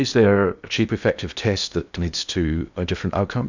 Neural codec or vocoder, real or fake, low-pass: codec, 16 kHz in and 24 kHz out, 0.6 kbps, FocalCodec, streaming, 2048 codes; fake; 7.2 kHz